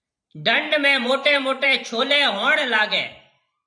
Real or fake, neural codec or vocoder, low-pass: fake; vocoder, 44.1 kHz, 128 mel bands every 512 samples, BigVGAN v2; 9.9 kHz